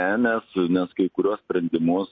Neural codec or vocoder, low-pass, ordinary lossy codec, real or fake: none; 7.2 kHz; MP3, 32 kbps; real